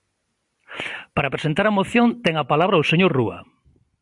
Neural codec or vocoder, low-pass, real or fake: none; 10.8 kHz; real